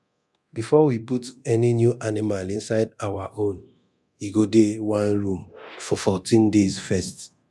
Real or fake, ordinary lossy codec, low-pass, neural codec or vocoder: fake; none; none; codec, 24 kHz, 0.9 kbps, DualCodec